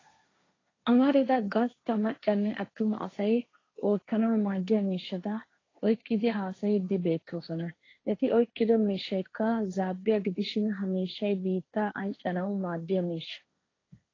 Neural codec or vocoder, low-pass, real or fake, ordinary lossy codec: codec, 16 kHz, 1.1 kbps, Voila-Tokenizer; 7.2 kHz; fake; AAC, 32 kbps